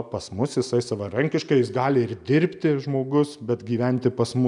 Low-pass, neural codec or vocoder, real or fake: 10.8 kHz; none; real